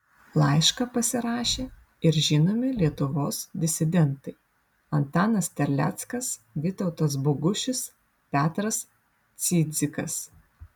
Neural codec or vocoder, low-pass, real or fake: none; 19.8 kHz; real